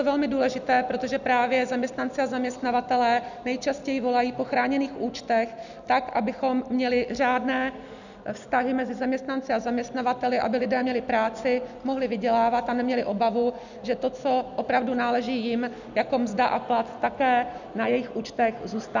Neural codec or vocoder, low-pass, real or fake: none; 7.2 kHz; real